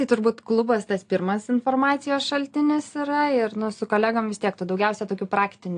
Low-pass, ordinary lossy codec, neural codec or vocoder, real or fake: 9.9 kHz; AAC, 48 kbps; none; real